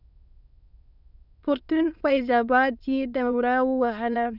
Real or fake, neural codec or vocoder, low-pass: fake; autoencoder, 22.05 kHz, a latent of 192 numbers a frame, VITS, trained on many speakers; 5.4 kHz